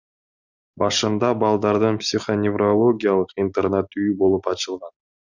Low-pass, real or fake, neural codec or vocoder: 7.2 kHz; real; none